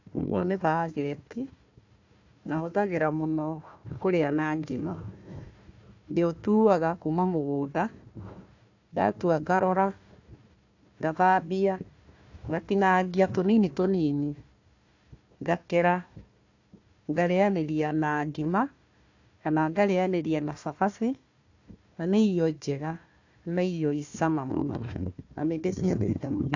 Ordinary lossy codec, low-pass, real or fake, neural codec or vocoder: AAC, 48 kbps; 7.2 kHz; fake; codec, 16 kHz, 1 kbps, FunCodec, trained on Chinese and English, 50 frames a second